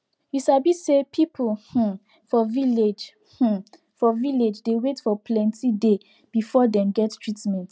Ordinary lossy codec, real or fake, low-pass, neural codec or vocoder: none; real; none; none